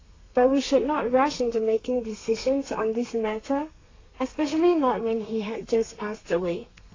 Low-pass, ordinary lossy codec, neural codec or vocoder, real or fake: 7.2 kHz; AAC, 32 kbps; codec, 32 kHz, 1.9 kbps, SNAC; fake